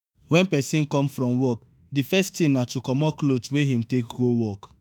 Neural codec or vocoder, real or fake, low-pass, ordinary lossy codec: autoencoder, 48 kHz, 32 numbers a frame, DAC-VAE, trained on Japanese speech; fake; none; none